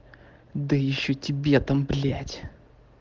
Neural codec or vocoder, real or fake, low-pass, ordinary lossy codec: none; real; 7.2 kHz; Opus, 16 kbps